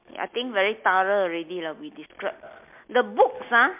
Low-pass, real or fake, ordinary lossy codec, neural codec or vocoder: 3.6 kHz; real; MP3, 32 kbps; none